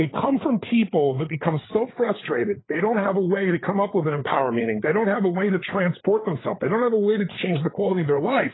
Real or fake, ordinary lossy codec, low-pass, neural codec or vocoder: fake; AAC, 16 kbps; 7.2 kHz; codec, 16 kHz, 4 kbps, FreqCodec, larger model